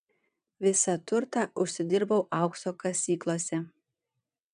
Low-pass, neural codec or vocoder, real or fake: 9.9 kHz; vocoder, 22.05 kHz, 80 mel bands, WaveNeXt; fake